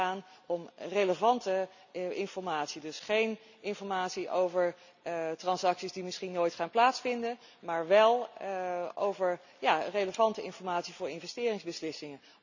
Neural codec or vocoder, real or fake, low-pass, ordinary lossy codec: none; real; 7.2 kHz; none